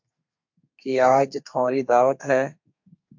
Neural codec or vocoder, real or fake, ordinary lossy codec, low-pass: codec, 32 kHz, 1.9 kbps, SNAC; fake; MP3, 48 kbps; 7.2 kHz